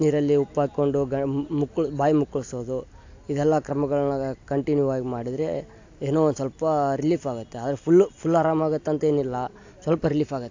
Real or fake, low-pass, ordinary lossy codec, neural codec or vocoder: real; 7.2 kHz; AAC, 48 kbps; none